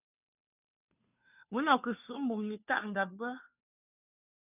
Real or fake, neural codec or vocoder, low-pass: fake; codec, 16 kHz, 2 kbps, FunCodec, trained on Chinese and English, 25 frames a second; 3.6 kHz